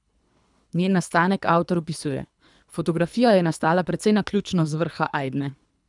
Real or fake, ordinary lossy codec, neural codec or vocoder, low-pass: fake; none; codec, 24 kHz, 3 kbps, HILCodec; 10.8 kHz